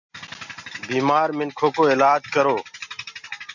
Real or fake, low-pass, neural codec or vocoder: real; 7.2 kHz; none